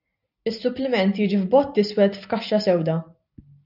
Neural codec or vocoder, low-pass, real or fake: none; 5.4 kHz; real